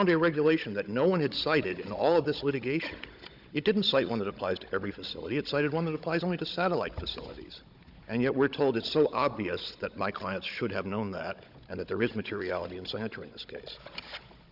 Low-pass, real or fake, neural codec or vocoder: 5.4 kHz; fake; codec, 16 kHz, 16 kbps, FunCodec, trained on Chinese and English, 50 frames a second